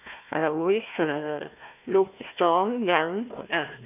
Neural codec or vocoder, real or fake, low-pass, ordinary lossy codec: codec, 16 kHz, 1 kbps, FunCodec, trained on Chinese and English, 50 frames a second; fake; 3.6 kHz; none